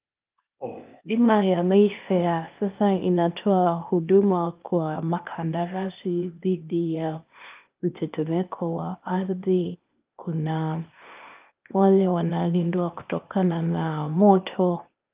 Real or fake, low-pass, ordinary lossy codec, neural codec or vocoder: fake; 3.6 kHz; Opus, 32 kbps; codec, 16 kHz, 0.8 kbps, ZipCodec